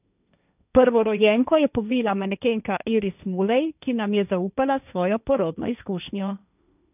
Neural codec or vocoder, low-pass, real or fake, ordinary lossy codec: codec, 16 kHz, 1.1 kbps, Voila-Tokenizer; 3.6 kHz; fake; none